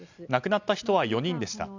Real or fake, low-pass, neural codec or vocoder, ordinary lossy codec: real; 7.2 kHz; none; none